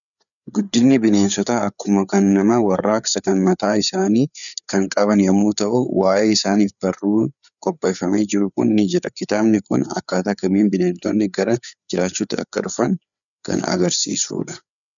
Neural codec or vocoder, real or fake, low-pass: codec, 16 kHz, 4 kbps, FreqCodec, larger model; fake; 7.2 kHz